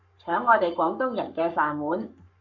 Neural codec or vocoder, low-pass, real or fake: codec, 44.1 kHz, 7.8 kbps, Pupu-Codec; 7.2 kHz; fake